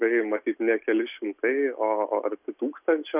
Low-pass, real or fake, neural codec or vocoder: 3.6 kHz; real; none